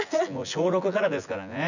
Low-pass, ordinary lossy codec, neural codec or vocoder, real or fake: 7.2 kHz; none; vocoder, 24 kHz, 100 mel bands, Vocos; fake